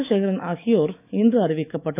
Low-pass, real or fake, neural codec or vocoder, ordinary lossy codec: 3.6 kHz; fake; autoencoder, 48 kHz, 128 numbers a frame, DAC-VAE, trained on Japanese speech; none